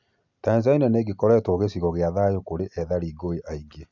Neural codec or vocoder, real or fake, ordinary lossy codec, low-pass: none; real; none; 7.2 kHz